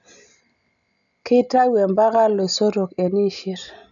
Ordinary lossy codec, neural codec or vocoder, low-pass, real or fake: none; none; 7.2 kHz; real